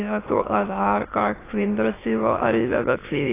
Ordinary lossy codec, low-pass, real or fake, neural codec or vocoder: AAC, 16 kbps; 3.6 kHz; fake; autoencoder, 22.05 kHz, a latent of 192 numbers a frame, VITS, trained on many speakers